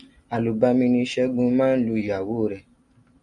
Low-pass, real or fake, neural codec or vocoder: 10.8 kHz; real; none